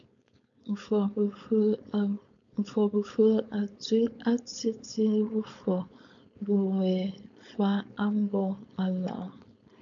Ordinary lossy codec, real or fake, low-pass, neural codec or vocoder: MP3, 96 kbps; fake; 7.2 kHz; codec, 16 kHz, 4.8 kbps, FACodec